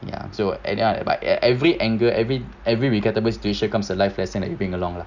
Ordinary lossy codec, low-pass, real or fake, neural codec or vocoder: none; 7.2 kHz; real; none